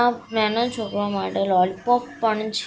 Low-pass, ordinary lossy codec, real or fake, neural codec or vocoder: none; none; real; none